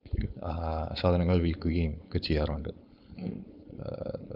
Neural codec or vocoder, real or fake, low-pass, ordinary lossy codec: codec, 16 kHz, 4.8 kbps, FACodec; fake; 5.4 kHz; none